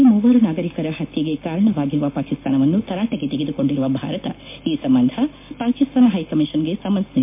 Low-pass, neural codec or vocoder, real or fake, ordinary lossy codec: 3.6 kHz; none; real; MP3, 24 kbps